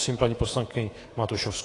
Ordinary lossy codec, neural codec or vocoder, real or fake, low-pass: AAC, 32 kbps; codec, 24 kHz, 3.1 kbps, DualCodec; fake; 10.8 kHz